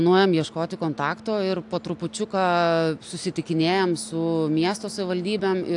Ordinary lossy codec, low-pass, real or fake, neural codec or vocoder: AAC, 64 kbps; 10.8 kHz; real; none